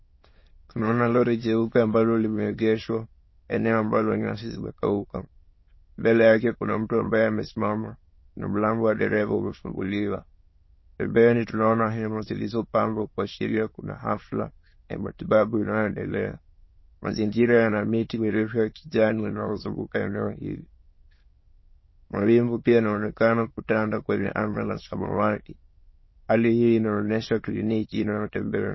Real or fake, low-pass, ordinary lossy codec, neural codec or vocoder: fake; 7.2 kHz; MP3, 24 kbps; autoencoder, 22.05 kHz, a latent of 192 numbers a frame, VITS, trained on many speakers